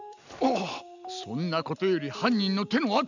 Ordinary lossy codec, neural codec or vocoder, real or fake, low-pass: none; none; real; 7.2 kHz